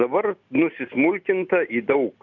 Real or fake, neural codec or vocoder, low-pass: real; none; 7.2 kHz